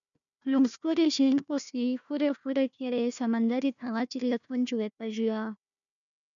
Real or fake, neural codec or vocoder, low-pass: fake; codec, 16 kHz, 1 kbps, FunCodec, trained on Chinese and English, 50 frames a second; 7.2 kHz